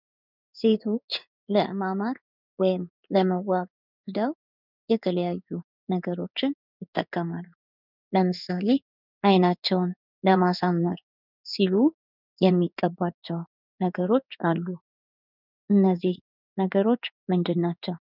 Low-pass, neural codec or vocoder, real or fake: 5.4 kHz; codec, 16 kHz in and 24 kHz out, 1 kbps, XY-Tokenizer; fake